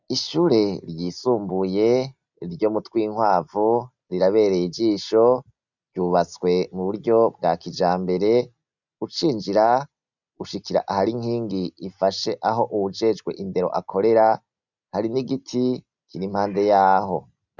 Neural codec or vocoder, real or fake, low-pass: none; real; 7.2 kHz